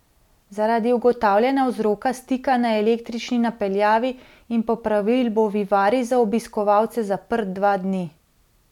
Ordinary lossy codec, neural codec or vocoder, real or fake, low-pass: none; none; real; 19.8 kHz